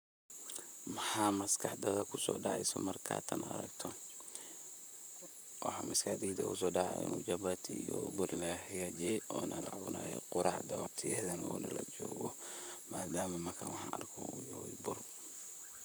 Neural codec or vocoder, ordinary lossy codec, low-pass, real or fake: vocoder, 44.1 kHz, 128 mel bands, Pupu-Vocoder; none; none; fake